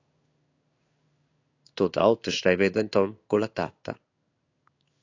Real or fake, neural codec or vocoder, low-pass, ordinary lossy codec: fake; codec, 16 kHz in and 24 kHz out, 1 kbps, XY-Tokenizer; 7.2 kHz; AAC, 48 kbps